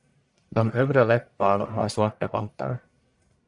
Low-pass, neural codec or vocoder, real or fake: 10.8 kHz; codec, 44.1 kHz, 1.7 kbps, Pupu-Codec; fake